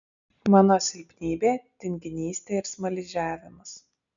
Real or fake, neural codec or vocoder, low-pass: real; none; 7.2 kHz